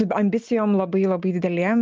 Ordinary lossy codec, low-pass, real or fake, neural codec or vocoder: Opus, 24 kbps; 7.2 kHz; real; none